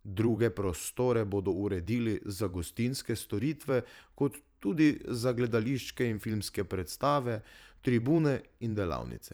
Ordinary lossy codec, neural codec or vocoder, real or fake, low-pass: none; vocoder, 44.1 kHz, 128 mel bands every 512 samples, BigVGAN v2; fake; none